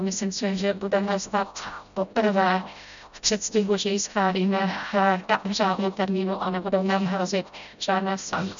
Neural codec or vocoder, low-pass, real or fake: codec, 16 kHz, 0.5 kbps, FreqCodec, smaller model; 7.2 kHz; fake